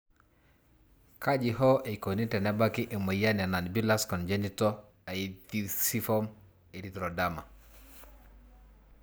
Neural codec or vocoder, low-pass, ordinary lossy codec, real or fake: none; none; none; real